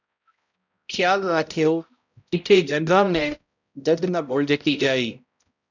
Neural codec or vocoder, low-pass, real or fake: codec, 16 kHz, 0.5 kbps, X-Codec, HuBERT features, trained on balanced general audio; 7.2 kHz; fake